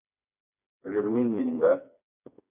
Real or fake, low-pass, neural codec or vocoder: fake; 3.6 kHz; codec, 16 kHz, 2 kbps, FreqCodec, smaller model